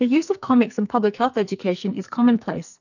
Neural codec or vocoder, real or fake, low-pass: codec, 44.1 kHz, 2.6 kbps, SNAC; fake; 7.2 kHz